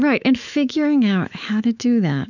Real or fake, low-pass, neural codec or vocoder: fake; 7.2 kHz; autoencoder, 48 kHz, 128 numbers a frame, DAC-VAE, trained on Japanese speech